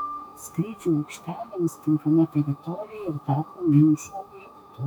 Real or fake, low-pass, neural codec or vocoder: fake; 19.8 kHz; autoencoder, 48 kHz, 32 numbers a frame, DAC-VAE, trained on Japanese speech